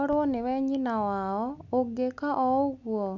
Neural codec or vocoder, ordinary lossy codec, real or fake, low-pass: none; none; real; 7.2 kHz